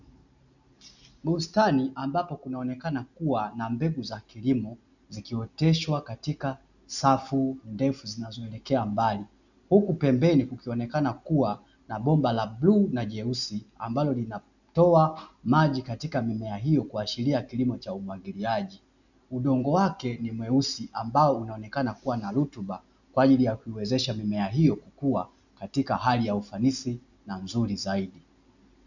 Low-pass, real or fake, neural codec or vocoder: 7.2 kHz; real; none